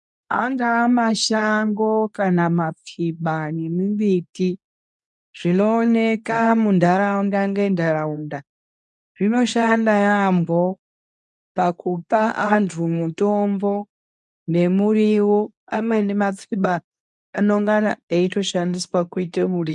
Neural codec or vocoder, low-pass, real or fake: codec, 24 kHz, 0.9 kbps, WavTokenizer, medium speech release version 2; 10.8 kHz; fake